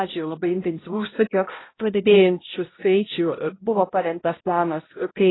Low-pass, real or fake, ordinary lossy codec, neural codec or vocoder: 7.2 kHz; fake; AAC, 16 kbps; codec, 16 kHz, 0.5 kbps, X-Codec, HuBERT features, trained on balanced general audio